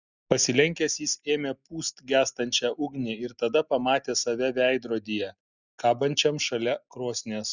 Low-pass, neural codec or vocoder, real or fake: 7.2 kHz; none; real